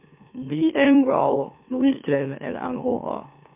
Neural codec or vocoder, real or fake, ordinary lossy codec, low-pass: autoencoder, 44.1 kHz, a latent of 192 numbers a frame, MeloTTS; fake; MP3, 32 kbps; 3.6 kHz